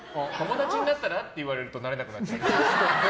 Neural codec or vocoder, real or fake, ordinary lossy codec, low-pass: none; real; none; none